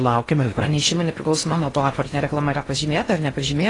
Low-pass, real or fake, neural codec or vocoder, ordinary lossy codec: 10.8 kHz; fake; codec, 16 kHz in and 24 kHz out, 0.6 kbps, FocalCodec, streaming, 4096 codes; AAC, 32 kbps